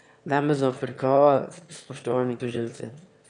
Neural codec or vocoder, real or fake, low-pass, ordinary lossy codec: autoencoder, 22.05 kHz, a latent of 192 numbers a frame, VITS, trained on one speaker; fake; 9.9 kHz; none